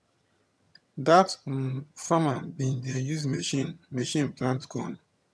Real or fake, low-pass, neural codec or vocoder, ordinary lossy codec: fake; none; vocoder, 22.05 kHz, 80 mel bands, HiFi-GAN; none